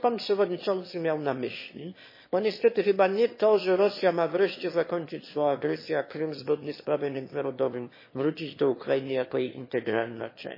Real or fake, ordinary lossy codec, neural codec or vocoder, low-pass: fake; MP3, 24 kbps; autoencoder, 22.05 kHz, a latent of 192 numbers a frame, VITS, trained on one speaker; 5.4 kHz